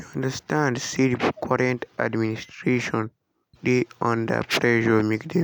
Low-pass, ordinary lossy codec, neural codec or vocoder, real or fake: none; none; none; real